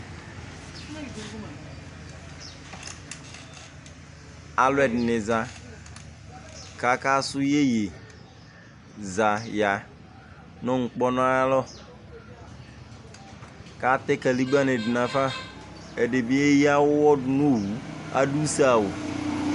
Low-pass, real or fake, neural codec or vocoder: 10.8 kHz; real; none